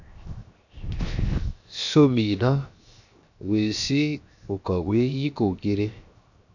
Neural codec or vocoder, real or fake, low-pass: codec, 16 kHz, 0.7 kbps, FocalCodec; fake; 7.2 kHz